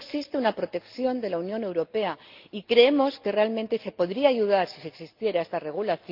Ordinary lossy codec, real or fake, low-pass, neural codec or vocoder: Opus, 24 kbps; real; 5.4 kHz; none